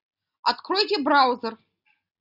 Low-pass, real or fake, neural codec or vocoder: 5.4 kHz; real; none